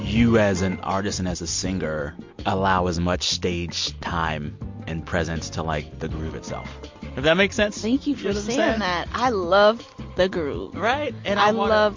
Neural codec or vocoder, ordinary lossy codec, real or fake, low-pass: none; MP3, 48 kbps; real; 7.2 kHz